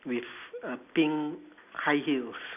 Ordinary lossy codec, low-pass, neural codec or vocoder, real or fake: none; 3.6 kHz; none; real